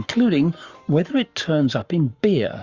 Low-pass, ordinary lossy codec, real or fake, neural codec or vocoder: 7.2 kHz; Opus, 64 kbps; fake; codec, 44.1 kHz, 7.8 kbps, Pupu-Codec